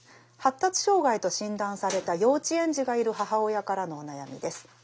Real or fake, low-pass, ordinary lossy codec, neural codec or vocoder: real; none; none; none